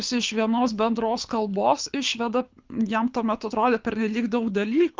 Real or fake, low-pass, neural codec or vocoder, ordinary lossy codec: fake; 7.2 kHz; codec, 24 kHz, 6 kbps, HILCodec; Opus, 24 kbps